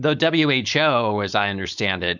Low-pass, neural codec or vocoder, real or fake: 7.2 kHz; codec, 16 kHz, 4.8 kbps, FACodec; fake